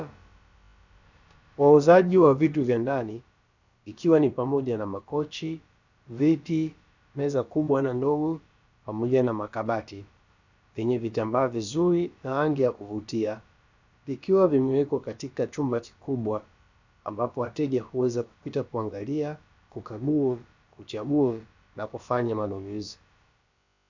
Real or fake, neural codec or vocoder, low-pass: fake; codec, 16 kHz, about 1 kbps, DyCAST, with the encoder's durations; 7.2 kHz